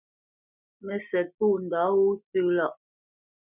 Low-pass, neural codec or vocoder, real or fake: 3.6 kHz; none; real